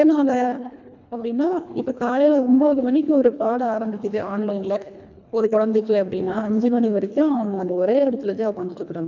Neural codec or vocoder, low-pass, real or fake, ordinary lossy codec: codec, 24 kHz, 1.5 kbps, HILCodec; 7.2 kHz; fake; none